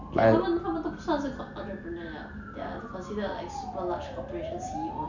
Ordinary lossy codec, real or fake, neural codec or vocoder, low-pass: none; real; none; 7.2 kHz